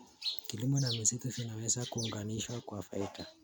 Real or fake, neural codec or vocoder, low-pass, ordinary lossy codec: real; none; none; none